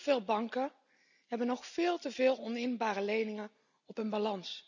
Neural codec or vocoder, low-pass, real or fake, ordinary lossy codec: none; 7.2 kHz; real; none